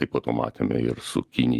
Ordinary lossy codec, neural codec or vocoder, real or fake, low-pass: Opus, 32 kbps; vocoder, 44.1 kHz, 128 mel bands every 512 samples, BigVGAN v2; fake; 14.4 kHz